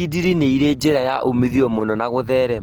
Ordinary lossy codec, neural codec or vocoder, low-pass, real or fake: Opus, 16 kbps; none; 19.8 kHz; real